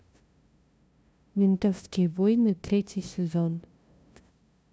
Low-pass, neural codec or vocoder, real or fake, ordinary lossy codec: none; codec, 16 kHz, 0.5 kbps, FunCodec, trained on LibriTTS, 25 frames a second; fake; none